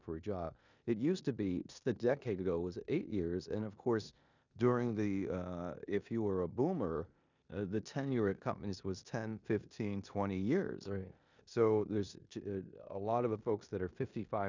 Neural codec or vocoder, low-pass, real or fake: codec, 16 kHz in and 24 kHz out, 0.9 kbps, LongCat-Audio-Codec, four codebook decoder; 7.2 kHz; fake